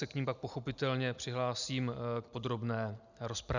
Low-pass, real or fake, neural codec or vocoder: 7.2 kHz; real; none